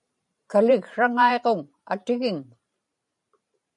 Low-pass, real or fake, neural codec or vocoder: 10.8 kHz; fake; vocoder, 44.1 kHz, 128 mel bands every 512 samples, BigVGAN v2